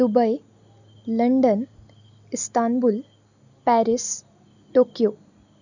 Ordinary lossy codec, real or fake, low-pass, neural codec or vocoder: none; real; 7.2 kHz; none